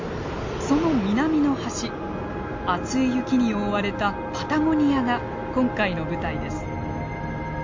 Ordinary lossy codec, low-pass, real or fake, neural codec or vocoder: MP3, 48 kbps; 7.2 kHz; real; none